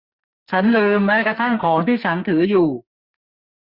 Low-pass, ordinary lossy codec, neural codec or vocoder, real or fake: 5.4 kHz; Opus, 64 kbps; codec, 44.1 kHz, 2.6 kbps, SNAC; fake